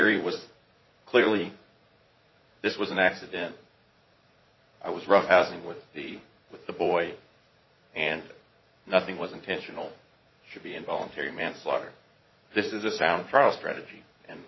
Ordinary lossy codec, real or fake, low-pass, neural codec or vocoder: MP3, 24 kbps; fake; 7.2 kHz; vocoder, 44.1 kHz, 80 mel bands, Vocos